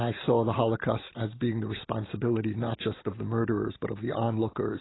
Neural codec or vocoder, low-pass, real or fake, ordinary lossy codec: none; 7.2 kHz; real; AAC, 16 kbps